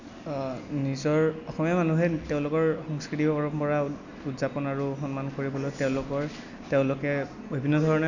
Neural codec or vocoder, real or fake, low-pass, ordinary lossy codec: none; real; 7.2 kHz; none